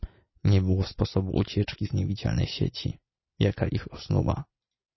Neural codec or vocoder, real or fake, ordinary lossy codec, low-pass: none; real; MP3, 24 kbps; 7.2 kHz